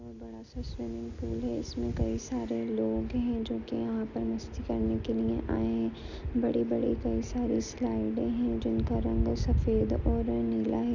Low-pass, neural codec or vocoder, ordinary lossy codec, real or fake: 7.2 kHz; none; none; real